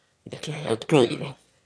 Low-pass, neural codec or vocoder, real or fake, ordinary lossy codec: none; autoencoder, 22.05 kHz, a latent of 192 numbers a frame, VITS, trained on one speaker; fake; none